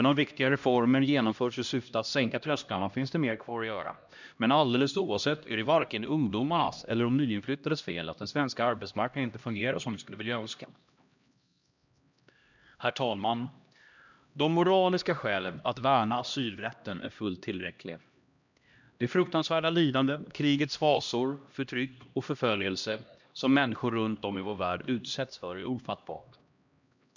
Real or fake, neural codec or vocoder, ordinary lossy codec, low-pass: fake; codec, 16 kHz, 1 kbps, X-Codec, HuBERT features, trained on LibriSpeech; none; 7.2 kHz